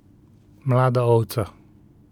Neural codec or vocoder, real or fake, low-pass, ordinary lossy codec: none; real; 19.8 kHz; none